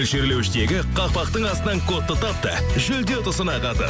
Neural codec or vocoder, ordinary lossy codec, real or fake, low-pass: none; none; real; none